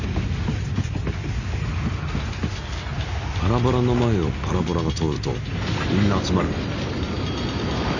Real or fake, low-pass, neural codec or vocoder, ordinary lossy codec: real; 7.2 kHz; none; none